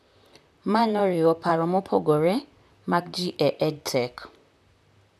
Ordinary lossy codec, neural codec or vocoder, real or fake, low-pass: none; vocoder, 44.1 kHz, 128 mel bands, Pupu-Vocoder; fake; 14.4 kHz